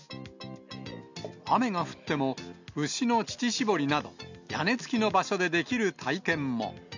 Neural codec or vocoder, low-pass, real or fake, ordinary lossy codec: none; 7.2 kHz; real; none